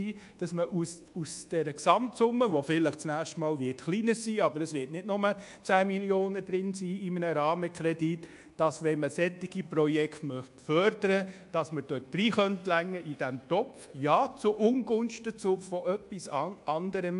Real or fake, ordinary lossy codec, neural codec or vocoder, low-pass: fake; AAC, 64 kbps; codec, 24 kHz, 1.2 kbps, DualCodec; 10.8 kHz